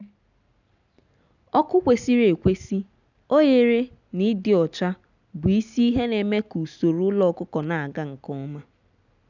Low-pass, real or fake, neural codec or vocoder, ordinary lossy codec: 7.2 kHz; real; none; none